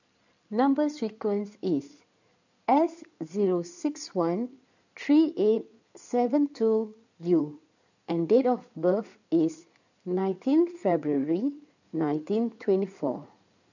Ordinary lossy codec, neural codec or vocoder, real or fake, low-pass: none; codec, 16 kHz in and 24 kHz out, 2.2 kbps, FireRedTTS-2 codec; fake; 7.2 kHz